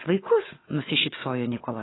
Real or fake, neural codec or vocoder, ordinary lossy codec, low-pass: fake; codec, 44.1 kHz, 7.8 kbps, Pupu-Codec; AAC, 16 kbps; 7.2 kHz